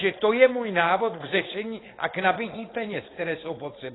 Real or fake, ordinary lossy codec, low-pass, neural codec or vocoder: fake; AAC, 16 kbps; 7.2 kHz; codec, 16 kHz, 4.8 kbps, FACodec